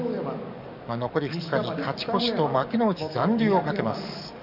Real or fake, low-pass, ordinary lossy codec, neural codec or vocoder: fake; 5.4 kHz; none; codec, 44.1 kHz, 7.8 kbps, DAC